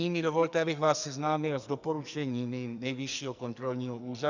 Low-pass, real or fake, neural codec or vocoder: 7.2 kHz; fake; codec, 44.1 kHz, 2.6 kbps, SNAC